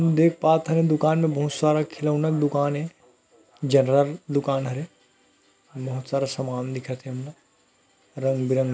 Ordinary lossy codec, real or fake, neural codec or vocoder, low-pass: none; real; none; none